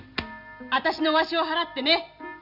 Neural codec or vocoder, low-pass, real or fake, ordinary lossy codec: none; 5.4 kHz; real; none